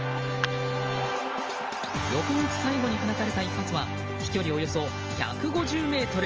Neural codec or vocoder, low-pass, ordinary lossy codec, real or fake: none; 7.2 kHz; Opus, 24 kbps; real